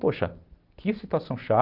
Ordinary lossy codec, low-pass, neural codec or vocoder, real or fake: Opus, 32 kbps; 5.4 kHz; none; real